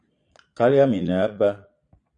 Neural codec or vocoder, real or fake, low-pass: vocoder, 22.05 kHz, 80 mel bands, Vocos; fake; 9.9 kHz